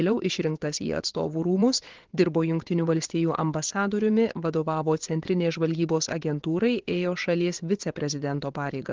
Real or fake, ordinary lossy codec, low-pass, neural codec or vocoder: fake; Opus, 16 kbps; 7.2 kHz; vocoder, 22.05 kHz, 80 mel bands, WaveNeXt